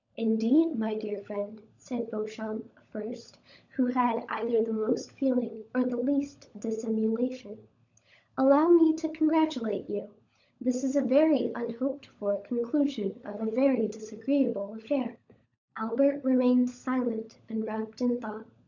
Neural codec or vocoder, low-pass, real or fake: codec, 16 kHz, 16 kbps, FunCodec, trained on LibriTTS, 50 frames a second; 7.2 kHz; fake